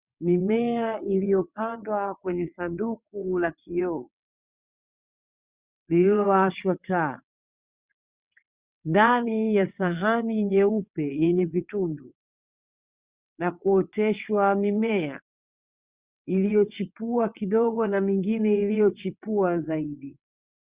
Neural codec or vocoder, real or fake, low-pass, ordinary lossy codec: vocoder, 22.05 kHz, 80 mel bands, WaveNeXt; fake; 3.6 kHz; Opus, 64 kbps